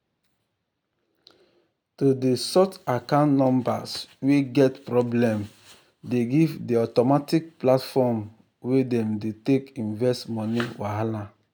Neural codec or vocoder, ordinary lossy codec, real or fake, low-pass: none; none; real; none